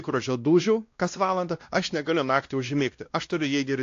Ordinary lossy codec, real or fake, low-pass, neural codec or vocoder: AAC, 48 kbps; fake; 7.2 kHz; codec, 16 kHz, 1 kbps, X-Codec, WavLM features, trained on Multilingual LibriSpeech